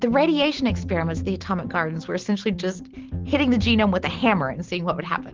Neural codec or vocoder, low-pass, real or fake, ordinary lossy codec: none; 7.2 kHz; real; Opus, 16 kbps